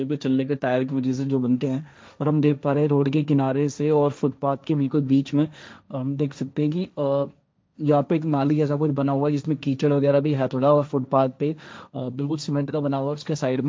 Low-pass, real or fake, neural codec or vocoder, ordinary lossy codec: none; fake; codec, 16 kHz, 1.1 kbps, Voila-Tokenizer; none